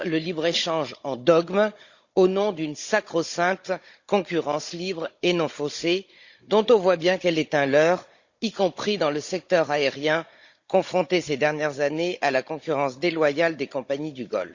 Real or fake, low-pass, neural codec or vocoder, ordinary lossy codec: fake; 7.2 kHz; codec, 16 kHz, 16 kbps, FunCodec, trained on Chinese and English, 50 frames a second; Opus, 64 kbps